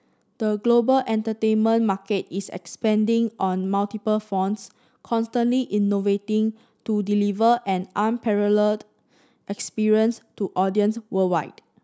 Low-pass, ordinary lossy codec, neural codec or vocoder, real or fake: none; none; none; real